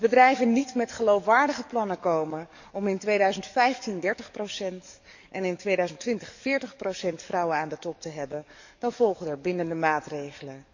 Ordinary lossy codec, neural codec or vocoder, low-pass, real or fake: none; codec, 44.1 kHz, 7.8 kbps, DAC; 7.2 kHz; fake